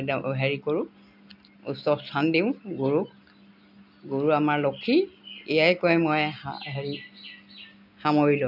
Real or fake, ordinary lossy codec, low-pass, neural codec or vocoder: real; none; 5.4 kHz; none